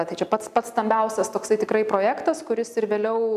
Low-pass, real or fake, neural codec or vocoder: 14.4 kHz; real; none